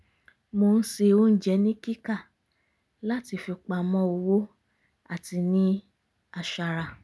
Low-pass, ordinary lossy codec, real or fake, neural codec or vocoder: none; none; real; none